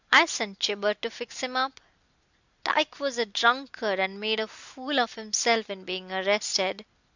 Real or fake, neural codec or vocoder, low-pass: real; none; 7.2 kHz